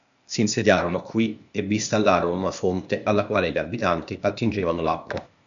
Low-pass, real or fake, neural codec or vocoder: 7.2 kHz; fake; codec, 16 kHz, 0.8 kbps, ZipCodec